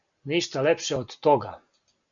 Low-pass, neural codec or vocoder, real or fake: 7.2 kHz; none; real